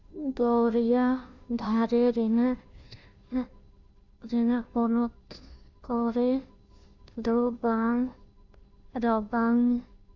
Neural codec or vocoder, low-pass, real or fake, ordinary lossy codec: codec, 16 kHz, 0.5 kbps, FunCodec, trained on Chinese and English, 25 frames a second; 7.2 kHz; fake; none